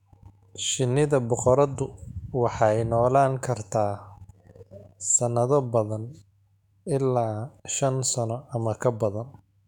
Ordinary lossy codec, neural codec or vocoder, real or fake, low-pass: none; autoencoder, 48 kHz, 128 numbers a frame, DAC-VAE, trained on Japanese speech; fake; 19.8 kHz